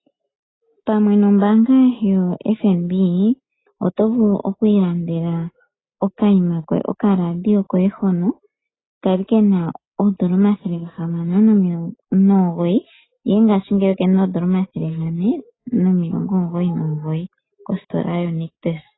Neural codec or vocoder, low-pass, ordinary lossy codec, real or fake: none; 7.2 kHz; AAC, 16 kbps; real